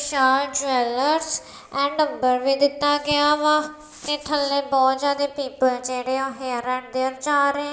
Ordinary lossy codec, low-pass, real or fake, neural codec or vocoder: none; none; real; none